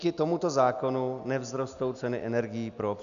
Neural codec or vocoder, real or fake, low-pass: none; real; 7.2 kHz